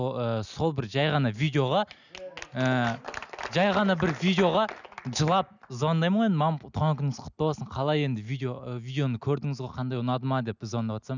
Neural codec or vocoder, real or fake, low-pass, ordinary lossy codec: none; real; 7.2 kHz; none